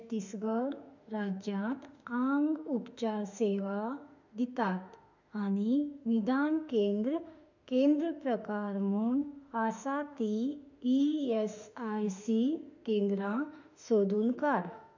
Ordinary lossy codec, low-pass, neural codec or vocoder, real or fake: none; 7.2 kHz; autoencoder, 48 kHz, 32 numbers a frame, DAC-VAE, trained on Japanese speech; fake